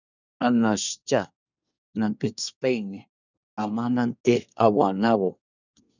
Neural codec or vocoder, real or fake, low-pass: codec, 24 kHz, 1 kbps, SNAC; fake; 7.2 kHz